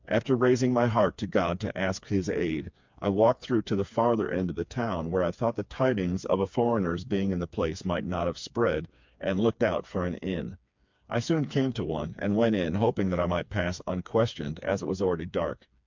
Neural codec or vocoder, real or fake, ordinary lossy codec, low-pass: codec, 16 kHz, 4 kbps, FreqCodec, smaller model; fake; MP3, 64 kbps; 7.2 kHz